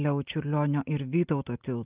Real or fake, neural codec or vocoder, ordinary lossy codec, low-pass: real; none; Opus, 24 kbps; 3.6 kHz